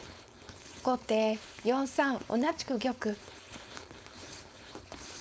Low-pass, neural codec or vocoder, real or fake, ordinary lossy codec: none; codec, 16 kHz, 4.8 kbps, FACodec; fake; none